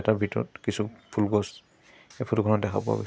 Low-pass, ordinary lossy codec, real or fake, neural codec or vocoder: none; none; real; none